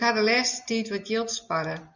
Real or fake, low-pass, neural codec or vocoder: real; 7.2 kHz; none